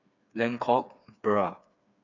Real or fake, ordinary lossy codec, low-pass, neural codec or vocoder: fake; none; 7.2 kHz; codec, 16 kHz, 4 kbps, FreqCodec, smaller model